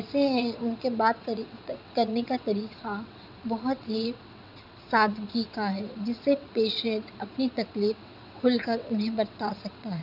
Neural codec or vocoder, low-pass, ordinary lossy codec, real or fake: vocoder, 22.05 kHz, 80 mel bands, WaveNeXt; 5.4 kHz; none; fake